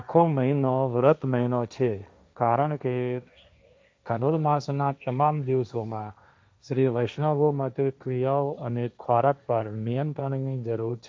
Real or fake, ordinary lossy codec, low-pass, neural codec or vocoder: fake; none; none; codec, 16 kHz, 1.1 kbps, Voila-Tokenizer